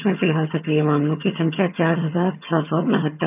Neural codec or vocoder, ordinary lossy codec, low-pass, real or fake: vocoder, 22.05 kHz, 80 mel bands, HiFi-GAN; none; 3.6 kHz; fake